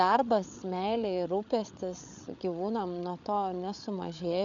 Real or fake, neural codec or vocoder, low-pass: fake; codec, 16 kHz, 8 kbps, FreqCodec, larger model; 7.2 kHz